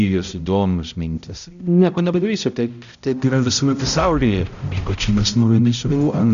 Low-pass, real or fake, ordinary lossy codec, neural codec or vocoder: 7.2 kHz; fake; MP3, 96 kbps; codec, 16 kHz, 0.5 kbps, X-Codec, HuBERT features, trained on balanced general audio